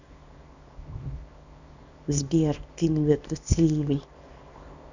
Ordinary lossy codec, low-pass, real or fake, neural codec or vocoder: none; 7.2 kHz; fake; codec, 24 kHz, 0.9 kbps, WavTokenizer, small release